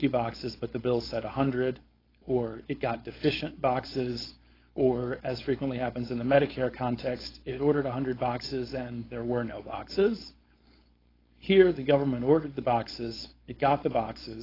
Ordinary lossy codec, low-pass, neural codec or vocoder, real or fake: AAC, 24 kbps; 5.4 kHz; codec, 16 kHz, 4.8 kbps, FACodec; fake